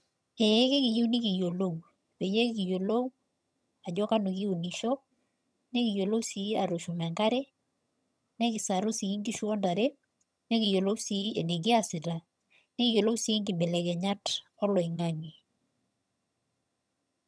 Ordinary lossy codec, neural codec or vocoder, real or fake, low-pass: none; vocoder, 22.05 kHz, 80 mel bands, HiFi-GAN; fake; none